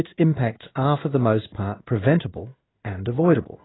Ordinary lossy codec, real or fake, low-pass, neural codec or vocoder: AAC, 16 kbps; fake; 7.2 kHz; codec, 16 kHz in and 24 kHz out, 1 kbps, XY-Tokenizer